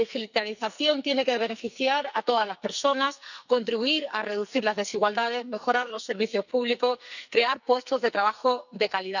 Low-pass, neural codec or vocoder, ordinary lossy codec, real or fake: 7.2 kHz; codec, 44.1 kHz, 2.6 kbps, SNAC; none; fake